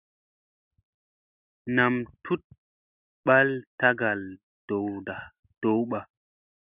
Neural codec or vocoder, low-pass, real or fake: none; 3.6 kHz; real